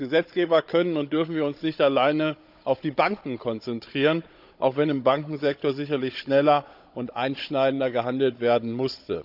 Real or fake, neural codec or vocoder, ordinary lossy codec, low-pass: fake; codec, 16 kHz, 16 kbps, FunCodec, trained on Chinese and English, 50 frames a second; none; 5.4 kHz